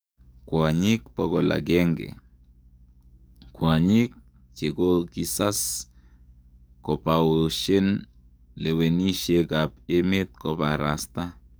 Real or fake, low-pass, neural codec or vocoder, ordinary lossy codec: fake; none; codec, 44.1 kHz, 7.8 kbps, DAC; none